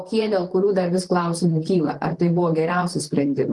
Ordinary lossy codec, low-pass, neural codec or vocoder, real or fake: Opus, 24 kbps; 10.8 kHz; vocoder, 44.1 kHz, 128 mel bands, Pupu-Vocoder; fake